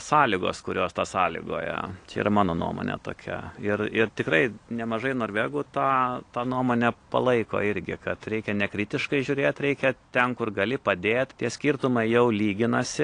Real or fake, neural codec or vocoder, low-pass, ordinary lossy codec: real; none; 9.9 kHz; AAC, 48 kbps